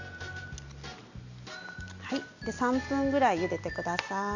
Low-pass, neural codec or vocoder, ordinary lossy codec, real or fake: 7.2 kHz; none; AAC, 48 kbps; real